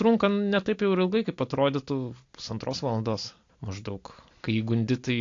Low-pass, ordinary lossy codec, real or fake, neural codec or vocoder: 7.2 kHz; AAC, 48 kbps; real; none